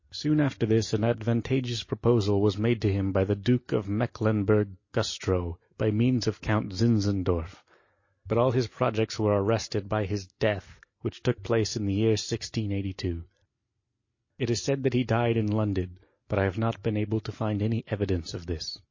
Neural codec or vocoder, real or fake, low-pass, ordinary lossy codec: none; real; 7.2 kHz; MP3, 32 kbps